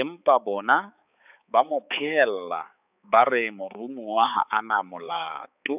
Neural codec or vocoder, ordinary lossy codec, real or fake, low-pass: codec, 16 kHz, 4 kbps, X-Codec, HuBERT features, trained on balanced general audio; none; fake; 3.6 kHz